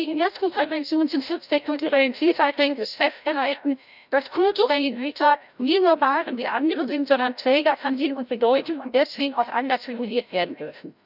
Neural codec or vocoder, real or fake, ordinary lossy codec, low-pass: codec, 16 kHz, 0.5 kbps, FreqCodec, larger model; fake; none; 5.4 kHz